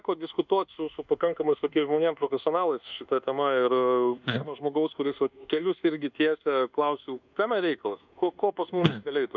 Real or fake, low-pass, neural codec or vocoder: fake; 7.2 kHz; codec, 24 kHz, 1.2 kbps, DualCodec